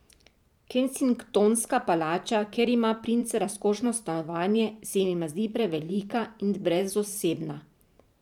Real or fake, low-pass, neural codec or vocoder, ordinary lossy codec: fake; 19.8 kHz; vocoder, 44.1 kHz, 128 mel bands every 512 samples, BigVGAN v2; none